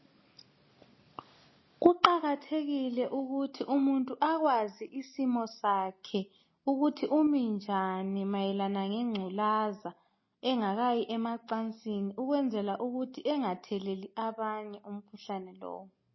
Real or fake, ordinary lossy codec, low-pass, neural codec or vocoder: real; MP3, 24 kbps; 7.2 kHz; none